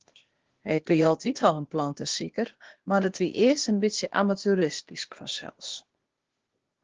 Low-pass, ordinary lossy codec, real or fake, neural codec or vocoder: 7.2 kHz; Opus, 16 kbps; fake; codec, 16 kHz, 0.8 kbps, ZipCodec